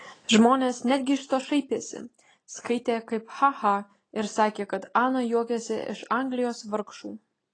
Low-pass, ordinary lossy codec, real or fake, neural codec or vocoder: 9.9 kHz; AAC, 32 kbps; real; none